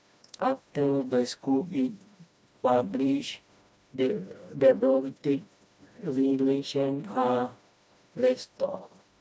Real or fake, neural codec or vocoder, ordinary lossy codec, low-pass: fake; codec, 16 kHz, 1 kbps, FreqCodec, smaller model; none; none